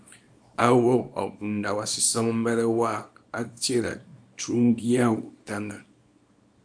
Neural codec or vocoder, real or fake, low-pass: codec, 24 kHz, 0.9 kbps, WavTokenizer, small release; fake; 9.9 kHz